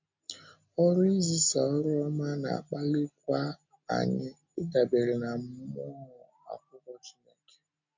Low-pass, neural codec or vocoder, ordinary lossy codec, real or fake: 7.2 kHz; none; MP3, 64 kbps; real